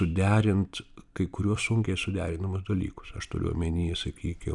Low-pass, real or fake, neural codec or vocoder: 10.8 kHz; fake; vocoder, 44.1 kHz, 128 mel bands every 256 samples, BigVGAN v2